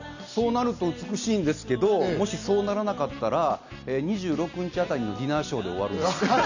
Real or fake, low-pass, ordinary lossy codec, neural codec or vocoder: real; 7.2 kHz; none; none